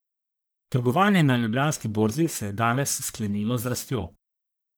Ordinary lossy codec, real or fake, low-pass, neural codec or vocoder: none; fake; none; codec, 44.1 kHz, 1.7 kbps, Pupu-Codec